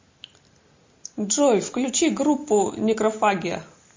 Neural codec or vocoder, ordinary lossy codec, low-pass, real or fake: none; MP3, 32 kbps; 7.2 kHz; real